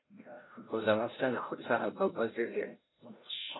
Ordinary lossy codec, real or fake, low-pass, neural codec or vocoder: AAC, 16 kbps; fake; 7.2 kHz; codec, 16 kHz, 0.5 kbps, FreqCodec, larger model